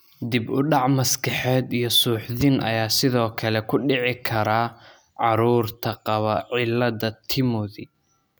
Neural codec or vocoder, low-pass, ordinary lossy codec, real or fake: none; none; none; real